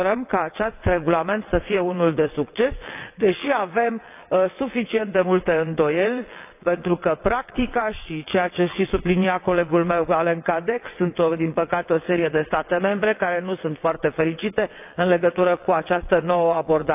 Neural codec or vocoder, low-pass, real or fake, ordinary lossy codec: vocoder, 22.05 kHz, 80 mel bands, WaveNeXt; 3.6 kHz; fake; AAC, 32 kbps